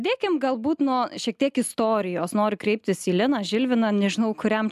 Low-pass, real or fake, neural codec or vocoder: 14.4 kHz; real; none